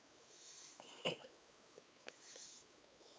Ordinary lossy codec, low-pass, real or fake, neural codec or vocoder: none; none; fake; codec, 16 kHz, 4 kbps, X-Codec, WavLM features, trained on Multilingual LibriSpeech